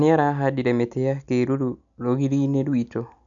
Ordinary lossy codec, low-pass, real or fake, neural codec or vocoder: none; 7.2 kHz; real; none